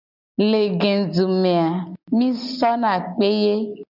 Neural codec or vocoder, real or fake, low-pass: none; real; 5.4 kHz